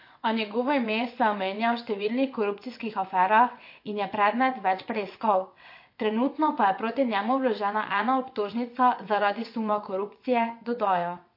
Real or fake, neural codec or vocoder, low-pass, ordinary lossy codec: fake; vocoder, 22.05 kHz, 80 mel bands, WaveNeXt; 5.4 kHz; MP3, 32 kbps